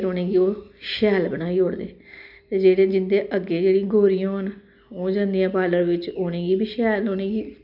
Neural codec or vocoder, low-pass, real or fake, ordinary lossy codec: none; 5.4 kHz; real; none